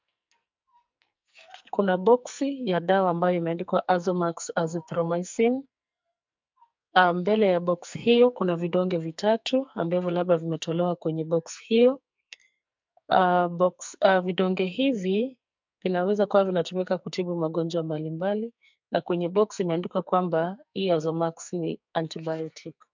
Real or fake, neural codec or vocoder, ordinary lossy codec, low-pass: fake; codec, 44.1 kHz, 2.6 kbps, SNAC; MP3, 64 kbps; 7.2 kHz